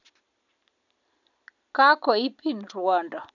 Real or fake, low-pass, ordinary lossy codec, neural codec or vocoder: real; 7.2 kHz; none; none